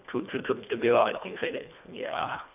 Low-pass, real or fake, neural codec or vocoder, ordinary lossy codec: 3.6 kHz; fake; codec, 24 kHz, 1.5 kbps, HILCodec; none